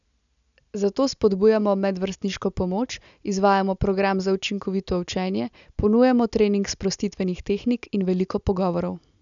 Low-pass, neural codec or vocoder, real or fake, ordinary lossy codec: 7.2 kHz; none; real; none